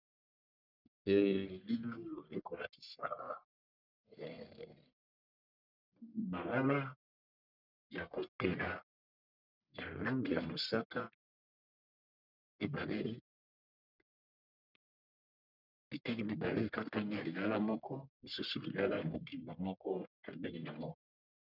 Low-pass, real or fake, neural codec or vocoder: 5.4 kHz; fake; codec, 44.1 kHz, 1.7 kbps, Pupu-Codec